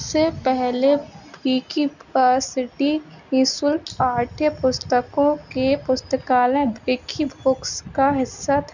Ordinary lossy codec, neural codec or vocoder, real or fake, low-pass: none; none; real; 7.2 kHz